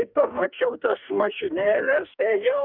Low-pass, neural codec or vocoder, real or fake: 5.4 kHz; codec, 44.1 kHz, 2.6 kbps, DAC; fake